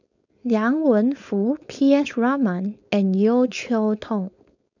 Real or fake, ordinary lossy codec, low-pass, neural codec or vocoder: fake; none; 7.2 kHz; codec, 16 kHz, 4.8 kbps, FACodec